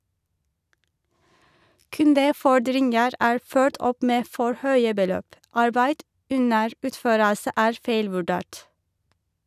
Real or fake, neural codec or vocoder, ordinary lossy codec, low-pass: real; none; none; 14.4 kHz